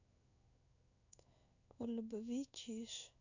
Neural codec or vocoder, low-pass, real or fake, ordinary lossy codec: codec, 16 kHz in and 24 kHz out, 1 kbps, XY-Tokenizer; 7.2 kHz; fake; none